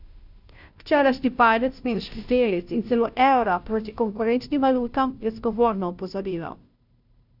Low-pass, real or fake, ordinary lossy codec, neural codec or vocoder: 5.4 kHz; fake; none; codec, 16 kHz, 0.5 kbps, FunCodec, trained on Chinese and English, 25 frames a second